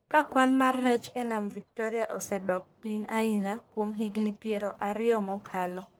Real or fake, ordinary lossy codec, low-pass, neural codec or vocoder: fake; none; none; codec, 44.1 kHz, 1.7 kbps, Pupu-Codec